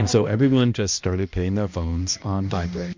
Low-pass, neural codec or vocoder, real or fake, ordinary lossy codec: 7.2 kHz; codec, 16 kHz, 1 kbps, X-Codec, HuBERT features, trained on balanced general audio; fake; MP3, 48 kbps